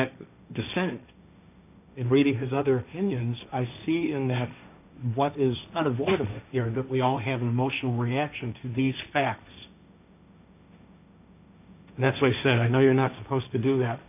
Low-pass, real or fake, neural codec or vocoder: 3.6 kHz; fake; codec, 16 kHz, 1.1 kbps, Voila-Tokenizer